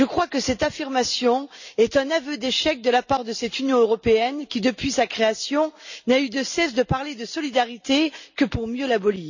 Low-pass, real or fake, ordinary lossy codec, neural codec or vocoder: 7.2 kHz; real; none; none